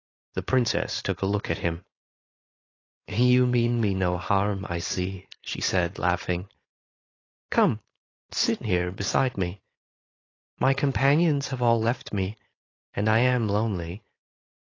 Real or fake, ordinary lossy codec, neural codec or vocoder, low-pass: fake; AAC, 32 kbps; codec, 16 kHz, 4.8 kbps, FACodec; 7.2 kHz